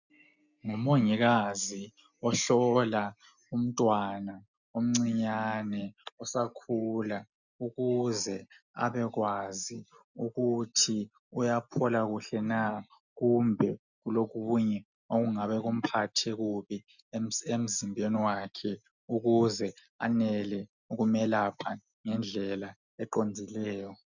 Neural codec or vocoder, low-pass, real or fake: none; 7.2 kHz; real